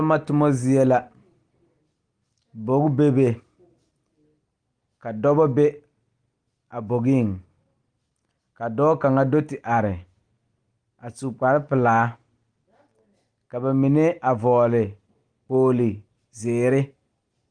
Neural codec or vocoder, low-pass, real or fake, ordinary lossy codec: none; 9.9 kHz; real; Opus, 24 kbps